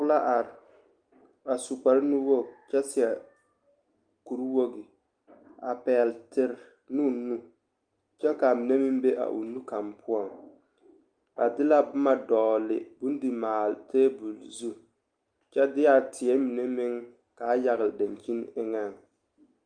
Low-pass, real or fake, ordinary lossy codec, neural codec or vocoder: 9.9 kHz; real; Opus, 32 kbps; none